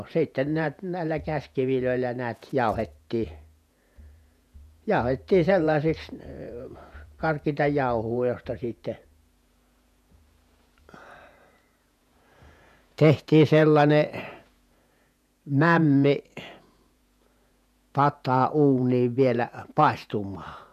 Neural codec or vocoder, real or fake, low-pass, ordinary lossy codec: vocoder, 48 kHz, 128 mel bands, Vocos; fake; 14.4 kHz; none